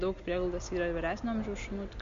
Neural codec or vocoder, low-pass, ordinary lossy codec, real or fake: none; 7.2 kHz; MP3, 48 kbps; real